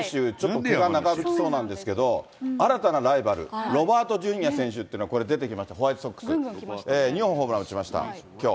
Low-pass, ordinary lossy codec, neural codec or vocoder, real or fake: none; none; none; real